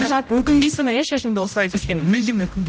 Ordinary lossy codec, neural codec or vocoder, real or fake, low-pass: none; codec, 16 kHz, 0.5 kbps, X-Codec, HuBERT features, trained on general audio; fake; none